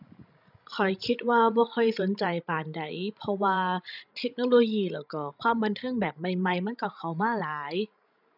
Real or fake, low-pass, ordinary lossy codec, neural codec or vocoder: fake; 5.4 kHz; none; codec, 16 kHz, 16 kbps, FreqCodec, larger model